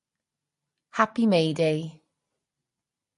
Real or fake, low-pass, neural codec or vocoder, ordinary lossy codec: real; 10.8 kHz; none; MP3, 48 kbps